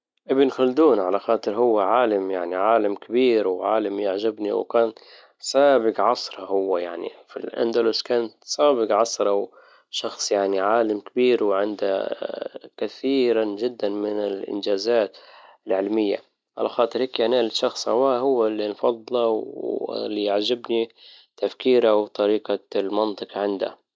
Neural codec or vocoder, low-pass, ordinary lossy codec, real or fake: none; none; none; real